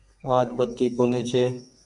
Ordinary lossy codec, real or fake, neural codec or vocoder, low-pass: MP3, 96 kbps; fake; codec, 32 kHz, 1.9 kbps, SNAC; 10.8 kHz